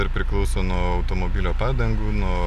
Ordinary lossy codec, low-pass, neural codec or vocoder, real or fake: AAC, 96 kbps; 14.4 kHz; none; real